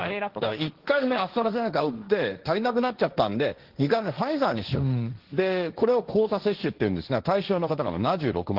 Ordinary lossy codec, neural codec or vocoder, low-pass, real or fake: Opus, 16 kbps; codec, 16 kHz, 1.1 kbps, Voila-Tokenizer; 5.4 kHz; fake